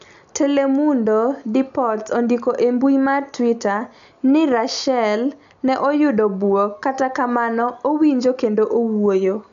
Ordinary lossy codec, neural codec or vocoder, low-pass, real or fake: none; none; 7.2 kHz; real